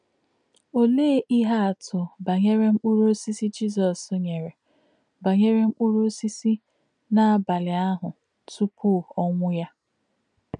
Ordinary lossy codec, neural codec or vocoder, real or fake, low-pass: none; none; real; 9.9 kHz